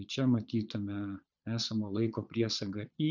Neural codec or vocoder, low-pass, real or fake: vocoder, 22.05 kHz, 80 mel bands, Vocos; 7.2 kHz; fake